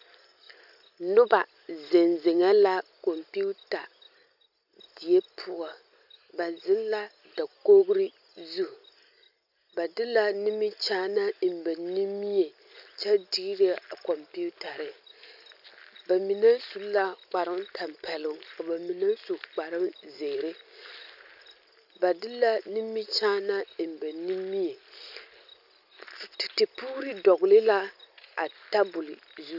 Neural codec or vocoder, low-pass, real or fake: none; 5.4 kHz; real